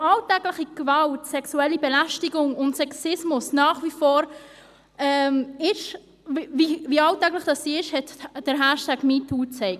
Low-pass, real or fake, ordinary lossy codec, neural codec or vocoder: 14.4 kHz; real; none; none